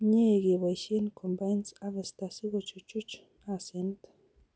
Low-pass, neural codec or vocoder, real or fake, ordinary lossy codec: none; none; real; none